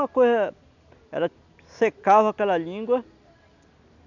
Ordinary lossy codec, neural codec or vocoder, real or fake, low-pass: none; none; real; 7.2 kHz